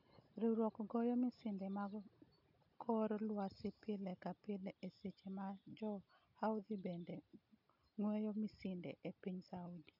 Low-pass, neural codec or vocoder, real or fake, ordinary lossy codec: 5.4 kHz; none; real; none